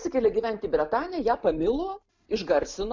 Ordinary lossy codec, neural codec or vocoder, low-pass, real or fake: MP3, 64 kbps; none; 7.2 kHz; real